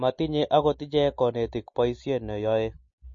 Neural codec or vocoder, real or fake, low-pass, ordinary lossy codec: none; real; 7.2 kHz; MP3, 32 kbps